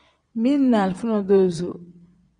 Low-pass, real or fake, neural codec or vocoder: 9.9 kHz; fake; vocoder, 22.05 kHz, 80 mel bands, Vocos